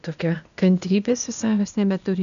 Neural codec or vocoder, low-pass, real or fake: codec, 16 kHz, 0.8 kbps, ZipCodec; 7.2 kHz; fake